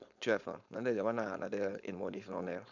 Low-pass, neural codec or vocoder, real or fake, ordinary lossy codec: 7.2 kHz; codec, 16 kHz, 4.8 kbps, FACodec; fake; none